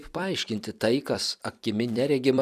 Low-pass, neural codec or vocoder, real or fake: 14.4 kHz; vocoder, 44.1 kHz, 128 mel bands every 256 samples, BigVGAN v2; fake